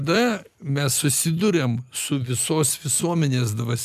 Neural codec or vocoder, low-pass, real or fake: none; 14.4 kHz; real